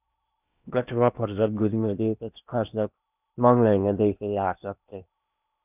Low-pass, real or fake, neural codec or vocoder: 3.6 kHz; fake; codec, 16 kHz in and 24 kHz out, 0.8 kbps, FocalCodec, streaming, 65536 codes